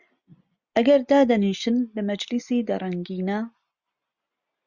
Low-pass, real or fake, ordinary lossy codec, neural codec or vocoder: 7.2 kHz; real; Opus, 64 kbps; none